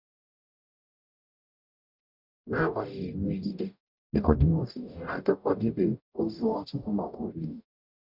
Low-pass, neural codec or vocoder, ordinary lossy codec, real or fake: 5.4 kHz; codec, 44.1 kHz, 0.9 kbps, DAC; none; fake